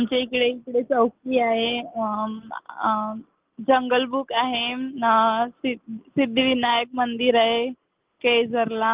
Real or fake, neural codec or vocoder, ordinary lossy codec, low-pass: real; none; Opus, 32 kbps; 3.6 kHz